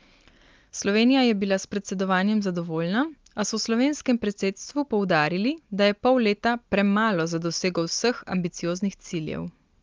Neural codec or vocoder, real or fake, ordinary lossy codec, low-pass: none; real; Opus, 32 kbps; 7.2 kHz